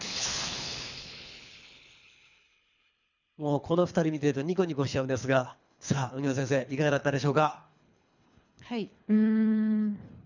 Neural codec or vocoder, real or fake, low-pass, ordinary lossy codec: codec, 24 kHz, 3 kbps, HILCodec; fake; 7.2 kHz; none